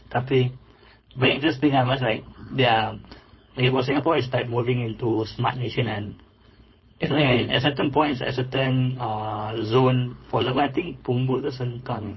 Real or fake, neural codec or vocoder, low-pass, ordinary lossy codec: fake; codec, 16 kHz, 4.8 kbps, FACodec; 7.2 kHz; MP3, 24 kbps